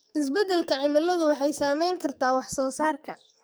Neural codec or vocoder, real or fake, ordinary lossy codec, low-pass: codec, 44.1 kHz, 2.6 kbps, SNAC; fake; none; none